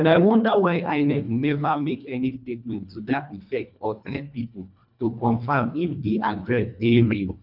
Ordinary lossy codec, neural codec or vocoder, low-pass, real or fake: none; codec, 24 kHz, 1.5 kbps, HILCodec; 5.4 kHz; fake